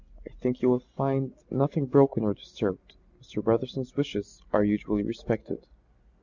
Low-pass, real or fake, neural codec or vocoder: 7.2 kHz; real; none